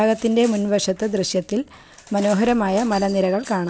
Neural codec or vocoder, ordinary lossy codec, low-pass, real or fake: none; none; none; real